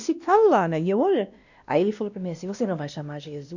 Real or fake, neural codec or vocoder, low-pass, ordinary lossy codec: fake; codec, 16 kHz, 1 kbps, X-Codec, WavLM features, trained on Multilingual LibriSpeech; 7.2 kHz; none